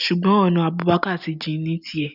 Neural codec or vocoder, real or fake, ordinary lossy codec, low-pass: none; real; none; 5.4 kHz